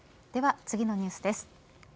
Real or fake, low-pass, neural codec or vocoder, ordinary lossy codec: real; none; none; none